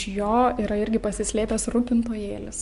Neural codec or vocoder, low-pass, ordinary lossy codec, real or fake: none; 10.8 kHz; MP3, 64 kbps; real